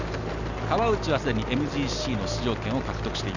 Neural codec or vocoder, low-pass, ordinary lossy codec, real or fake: none; 7.2 kHz; none; real